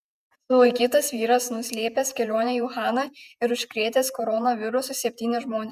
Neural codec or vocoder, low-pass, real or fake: vocoder, 48 kHz, 128 mel bands, Vocos; 14.4 kHz; fake